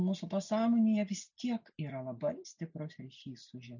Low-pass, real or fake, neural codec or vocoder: 7.2 kHz; real; none